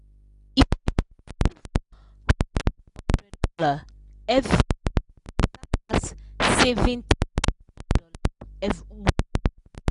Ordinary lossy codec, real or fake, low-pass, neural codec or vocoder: none; real; 10.8 kHz; none